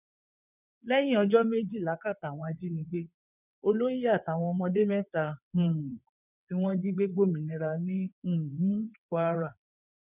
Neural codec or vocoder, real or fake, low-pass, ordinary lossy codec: vocoder, 22.05 kHz, 80 mel bands, WaveNeXt; fake; 3.6 kHz; none